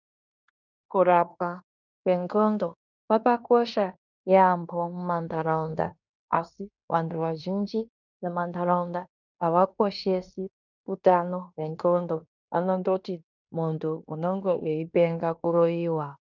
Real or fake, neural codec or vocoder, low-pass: fake; codec, 16 kHz in and 24 kHz out, 0.9 kbps, LongCat-Audio-Codec, fine tuned four codebook decoder; 7.2 kHz